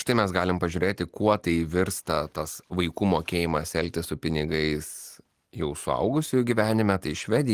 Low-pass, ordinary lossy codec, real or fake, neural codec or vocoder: 14.4 kHz; Opus, 24 kbps; real; none